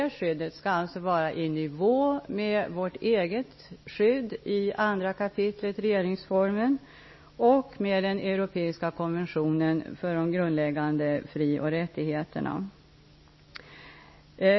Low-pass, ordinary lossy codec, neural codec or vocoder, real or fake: 7.2 kHz; MP3, 24 kbps; none; real